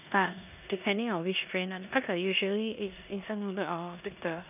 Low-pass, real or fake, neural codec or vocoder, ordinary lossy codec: 3.6 kHz; fake; codec, 16 kHz in and 24 kHz out, 0.9 kbps, LongCat-Audio-Codec, four codebook decoder; none